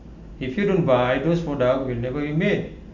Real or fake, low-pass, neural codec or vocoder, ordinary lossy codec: real; 7.2 kHz; none; none